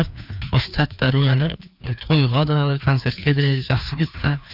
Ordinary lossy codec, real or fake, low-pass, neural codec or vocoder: none; fake; 5.4 kHz; codec, 16 kHz, 2 kbps, FreqCodec, larger model